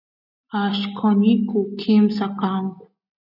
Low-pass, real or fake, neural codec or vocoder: 5.4 kHz; real; none